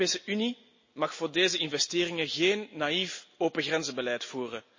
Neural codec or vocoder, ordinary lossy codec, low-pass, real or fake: none; MP3, 32 kbps; 7.2 kHz; real